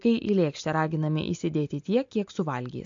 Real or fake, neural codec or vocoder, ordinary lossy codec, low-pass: real; none; AAC, 64 kbps; 7.2 kHz